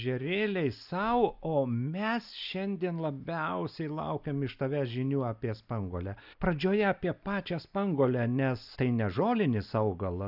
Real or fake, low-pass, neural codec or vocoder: real; 5.4 kHz; none